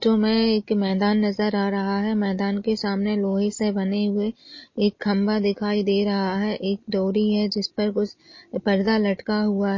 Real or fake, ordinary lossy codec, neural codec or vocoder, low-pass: real; MP3, 32 kbps; none; 7.2 kHz